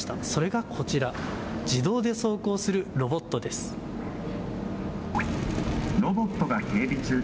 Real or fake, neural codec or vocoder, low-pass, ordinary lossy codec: real; none; none; none